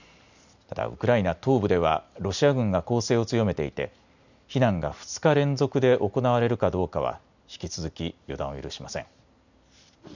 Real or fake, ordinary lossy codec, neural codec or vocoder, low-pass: real; none; none; 7.2 kHz